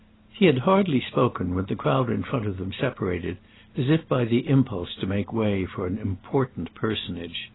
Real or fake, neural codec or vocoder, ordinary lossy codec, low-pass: real; none; AAC, 16 kbps; 7.2 kHz